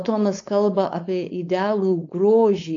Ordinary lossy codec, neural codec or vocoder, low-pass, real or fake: AAC, 48 kbps; codec, 16 kHz, 4.8 kbps, FACodec; 7.2 kHz; fake